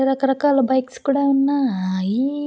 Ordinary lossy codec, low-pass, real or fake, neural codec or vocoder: none; none; real; none